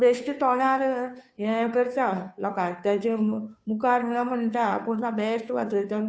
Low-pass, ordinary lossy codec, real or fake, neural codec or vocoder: none; none; fake; codec, 16 kHz, 2 kbps, FunCodec, trained on Chinese and English, 25 frames a second